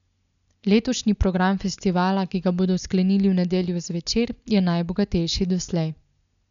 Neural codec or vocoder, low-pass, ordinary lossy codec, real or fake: none; 7.2 kHz; none; real